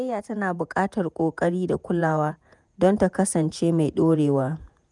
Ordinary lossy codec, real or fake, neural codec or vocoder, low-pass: none; fake; vocoder, 48 kHz, 128 mel bands, Vocos; 10.8 kHz